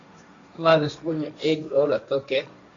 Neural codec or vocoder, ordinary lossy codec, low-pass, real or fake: codec, 16 kHz, 1.1 kbps, Voila-Tokenizer; MP3, 48 kbps; 7.2 kHz; fake